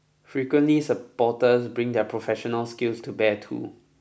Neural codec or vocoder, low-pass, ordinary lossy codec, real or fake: none; none; none; real